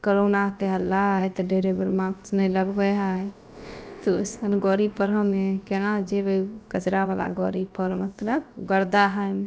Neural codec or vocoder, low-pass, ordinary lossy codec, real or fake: codec, 16 kHz, about 1 kbps, DyCAST, with the encoder's durations; none; none; fake